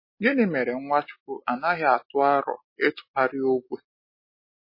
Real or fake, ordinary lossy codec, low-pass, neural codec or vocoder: real; MP3, 24 kbps; 5.4 kHz; none